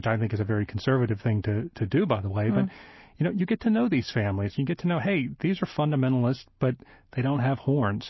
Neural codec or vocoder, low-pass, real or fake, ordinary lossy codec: none; 7.2 kHz; real; MP3, 24 kbps